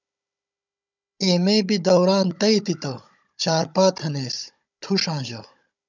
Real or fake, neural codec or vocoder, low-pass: fake; codec, 16 kHz, 16 kbps, FunCodec, trained on Chinese and English, 50 frames a second; 7.2 kHz